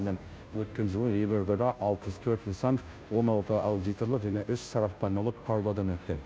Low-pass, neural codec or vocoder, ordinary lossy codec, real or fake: none; codec, 16 kHz, 0.5 kbps, FunCodec, trained on Chinese and English, 25 frames a second; none; fake